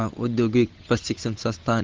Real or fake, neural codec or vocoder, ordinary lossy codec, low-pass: fake; codec, 16 kHz, 8 kbps, FunCodec, trained on Chinese and English, 25 frames a second; Opus, 16 kbps; 7.2 kHz